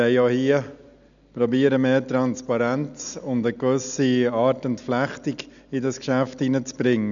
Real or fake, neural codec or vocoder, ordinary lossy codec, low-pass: real; none; none; 7.2 kHz